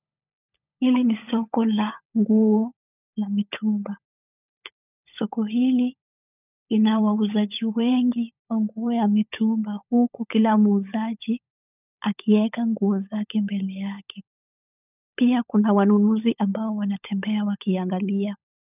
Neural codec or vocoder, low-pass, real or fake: codec, 16 kHz, 16 kbps, FunCodec, trained on LibriTTS, 50 frames a second; 3.6 kHz; fake